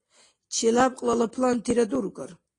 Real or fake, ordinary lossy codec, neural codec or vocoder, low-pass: real; AAC, 32 kbps; none; 10.8 kHz